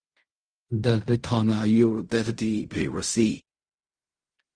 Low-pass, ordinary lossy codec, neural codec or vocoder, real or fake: 9.9 kHz; Opus, 24 kbps; codec, 16 kHz in and 24 kHz out, 0.4 kbps, LongCat-Audio-Codec, fine tuned four codebook decoder; fake